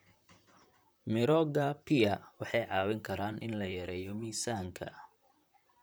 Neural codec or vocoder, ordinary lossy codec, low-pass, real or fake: vocoder, 44.1 kHz, 128 mel bands, Pupu-Vocoder; none; none; fake